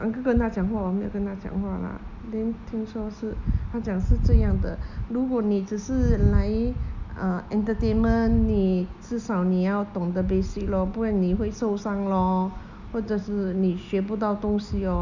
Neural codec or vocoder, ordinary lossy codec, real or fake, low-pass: none; none; real; 7.2 kHz